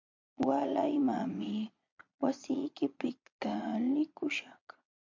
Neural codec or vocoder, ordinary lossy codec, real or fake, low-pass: vocoder, 22.05 kHz, 80 mel bands, Vocos; MP3, 64 kbps; fake; 7.2 kHz